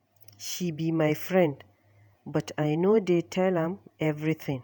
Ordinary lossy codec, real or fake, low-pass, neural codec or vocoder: none; fake; none; vocoder, 48 kHz, 128 mel bands, Vocos